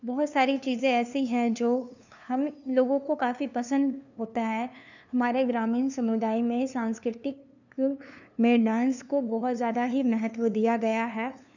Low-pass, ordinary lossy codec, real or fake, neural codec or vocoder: 7.2 kHz; none; fake; codec, 16 kHz, 2 kbps, FunCodec, trained on LibriTTS, 25 frames a second